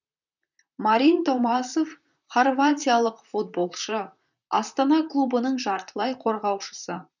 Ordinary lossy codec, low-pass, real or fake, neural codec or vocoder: none; 7.2 kHz; fake; vocoder, 44.1 kHz, 128 mel bands, Pupu-Vocoder